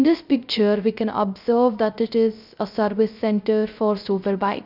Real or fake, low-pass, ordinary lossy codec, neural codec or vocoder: fake; 5.4 kHz; none; codec, 16 kHz, 0.3 kbps, FocalCodec